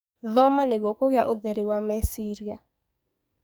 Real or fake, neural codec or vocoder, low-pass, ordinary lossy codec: fake; codec, 44.1 kHz, 2.6 kbps, SNAC; none; none